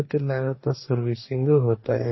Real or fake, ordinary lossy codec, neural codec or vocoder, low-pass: fake; MP3, 24 kbps; codec, 44.1 kHz, 2.6 kbps, DAC; 7.2 kHz